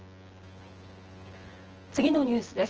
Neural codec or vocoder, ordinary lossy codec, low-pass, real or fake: vocoder, 24 kHz, 100 mel bands, Vocos; Opus, 16 kbps; 7.2 kHz; fake